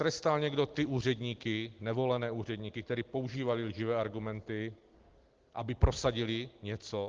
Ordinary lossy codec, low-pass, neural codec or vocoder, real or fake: Opus, 16 kbps; 7.2 kHz; none; real